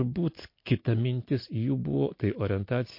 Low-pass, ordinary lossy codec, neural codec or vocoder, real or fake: 5.4 kHz; MP3, 32 kbps; none; real